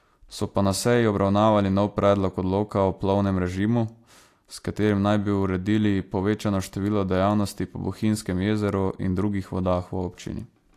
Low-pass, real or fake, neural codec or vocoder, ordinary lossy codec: 14.4 kHz; real; none; AAC, 64 kbps